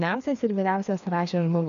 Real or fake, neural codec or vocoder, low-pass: fake; codec, 16 kHz, 2 kbps, FreqCodec, larger model; 7.2 kHz